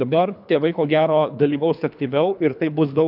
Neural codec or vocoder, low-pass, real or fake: codec, 24 kHz, 3 kbps, HILCodec; 5.4 kHz; fake